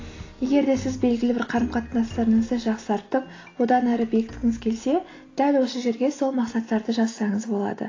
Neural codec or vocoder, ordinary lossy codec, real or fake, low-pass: none; AAC, 32 kbps; real; 7.2 kHz